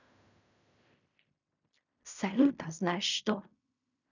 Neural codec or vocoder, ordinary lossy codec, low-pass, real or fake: codec, 16 kHz in and 24 kHz out, 0.4 kbps, LongCat-Audio-Codec, fine tuned four codebook decoder; none; 7.2 kHz; fake